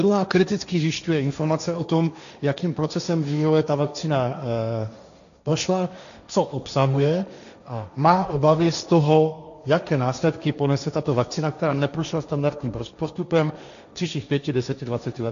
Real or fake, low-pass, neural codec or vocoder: fake; 7.2 kHz; codec, 16 kHz, 1.1 kbps, Voila-Tokenizer